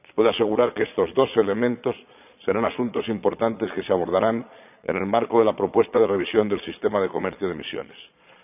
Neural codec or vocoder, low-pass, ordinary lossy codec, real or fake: vocoder, 22.05 kHz, 80 mel bands, Vocos; 3.6 kHz; none; fake